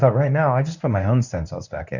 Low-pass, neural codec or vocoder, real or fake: 7.2 kHz; codec, 24 kHz, 0.9 kbps, WavTokenizer, medium speech release version 1; fake